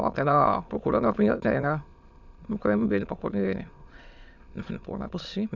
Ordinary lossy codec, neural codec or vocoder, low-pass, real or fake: Opus, 64 kbps; autoencoder, 22.05 kHz, a latent of 192 numbers a frame, VITS, trained on many speakers; 7.2 kHz; fake